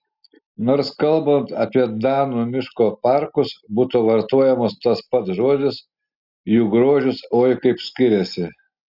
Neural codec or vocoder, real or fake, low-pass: none; real; 5.4 kHz